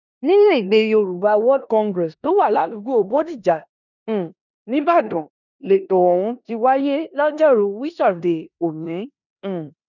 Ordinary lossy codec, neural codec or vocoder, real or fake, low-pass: none; codec, 16 kHz in and 24 kHz out, 0.9 kbps, LongCat-Audio-Codec, four codebook decoder; fake; 7.2 kHz